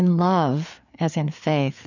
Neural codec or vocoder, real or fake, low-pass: codec, 16 kHz, 4 kbps, FreqCodec, larger model; fake; 7.2 kHz